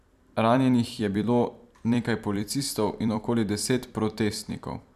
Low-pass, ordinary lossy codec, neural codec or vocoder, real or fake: 14.4 kHz; none; vocoder, 44.1 kHz, 128 mel bands every 256 samples, BigVGAN v2; fake